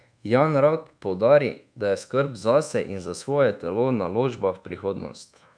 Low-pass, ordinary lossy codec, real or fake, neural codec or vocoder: 9.9 kHz; none; fake; codec, 24 kHz, 1.2 kbps, DualCodec